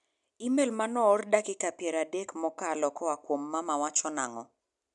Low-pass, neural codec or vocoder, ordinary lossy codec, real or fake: 10.8 kHz; none; none; real